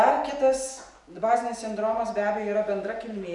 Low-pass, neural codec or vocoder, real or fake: 10.8 kHz; none; real